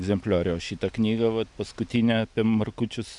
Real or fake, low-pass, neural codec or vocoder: real; 10.8 kHz; none